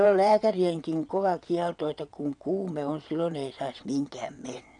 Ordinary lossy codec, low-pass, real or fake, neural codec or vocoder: none; 9.9 kHz; fake; vocoder, 22.05 kHz, 80 mel bands, WaveNeXt